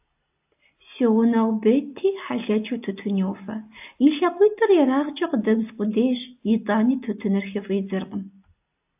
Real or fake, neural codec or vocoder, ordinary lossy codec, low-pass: real; none; AAC, 32 kbps; 3.6 kHz